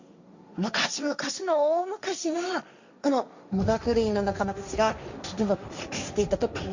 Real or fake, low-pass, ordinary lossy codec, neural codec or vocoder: fake; 7.2 kHz; Opus, 64 kbps; codec, 16 kHz, 1.1 kbps, Voila-Tokenizer